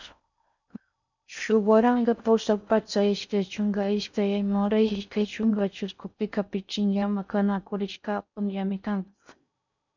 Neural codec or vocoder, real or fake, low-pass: codec, 16 kHz in and 24 kHz out, 0.6 kbps, FocalCodec, streaming, 4096 codes; fake; 7.2 kHz